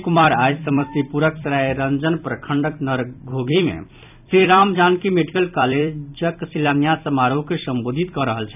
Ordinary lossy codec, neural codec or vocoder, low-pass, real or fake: none; vocoder, 44.1 kHz, 128 mel bands every 256 samples, BigVGAN v2; 3.6 kHz; fake